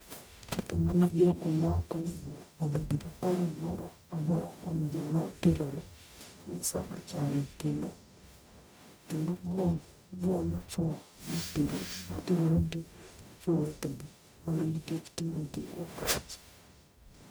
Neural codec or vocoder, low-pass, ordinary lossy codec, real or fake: codec, 44.1 kHz, 0.9 kbps, DAC; none; none; fake